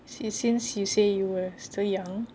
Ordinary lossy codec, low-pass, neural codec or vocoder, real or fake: none; none; none; real